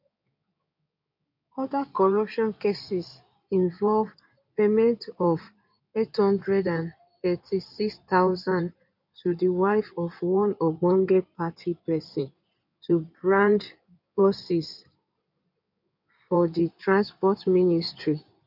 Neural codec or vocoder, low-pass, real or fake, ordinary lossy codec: codec, 16 kHz in and 24 kHz out, 2.2 kbps, FireRedTTS-2 codec; 5.4 kHz; fake; none